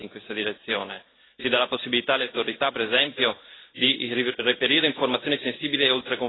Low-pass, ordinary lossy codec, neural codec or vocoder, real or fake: 7.2 kHz; AAC, 16 kbps; none; real